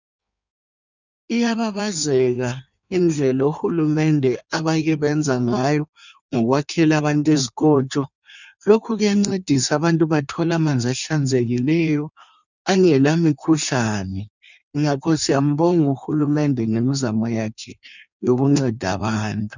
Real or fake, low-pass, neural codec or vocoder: fake; 7.2 kHz; codec, 16 kHz in and 24 kHz out, 1.1 kbps, FireRedTTS-2 codec